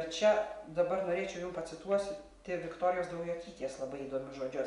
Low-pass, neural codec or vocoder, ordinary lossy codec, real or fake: 10.8 kHz; none; MP3, 64 kbps; real